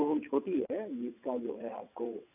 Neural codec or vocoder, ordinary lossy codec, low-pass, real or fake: vocoder, 22.05 kHz, 80 mel bands, WaveNeXt; none; 3.6 kHz; fake